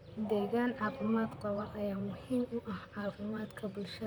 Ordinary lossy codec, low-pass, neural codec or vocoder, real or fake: none; none; vocoder, 44.1 kHz, 128 mel bands, Pupu-Vocoder; fake